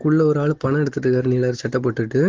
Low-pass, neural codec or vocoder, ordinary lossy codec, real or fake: 7.2 kHz; none; Opus, 16 kbps; real